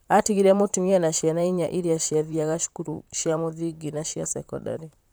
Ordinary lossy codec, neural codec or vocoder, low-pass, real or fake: none; vocoder, 44.1 kHz, 128 mel bands, Pupu-Vocoder; none; fake